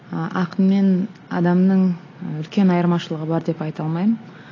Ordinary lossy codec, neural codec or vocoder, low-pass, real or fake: AAC, 32 kbps; none; 7.2 kHz; real